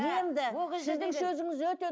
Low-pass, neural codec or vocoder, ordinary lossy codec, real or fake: none; none; none; real